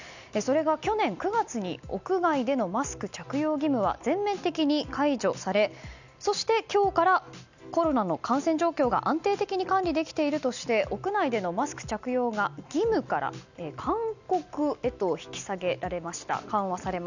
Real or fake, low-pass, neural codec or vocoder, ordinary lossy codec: real; 7.2 kHz; none; none